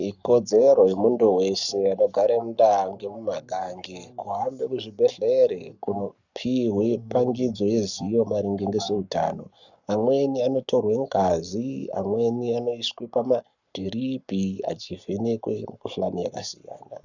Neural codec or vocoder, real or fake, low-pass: codec, 44.1 kHz, 7.8 kbps, Pupu-Codec; fake; 7.2 kHz